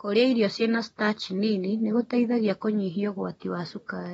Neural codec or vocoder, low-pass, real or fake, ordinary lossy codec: none; 19.8 kHz; real; AAC, 24 kbps